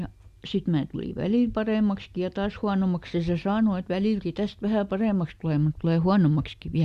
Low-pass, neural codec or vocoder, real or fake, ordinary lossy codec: 14.4 kHz; none; real; Opus, 64 kbps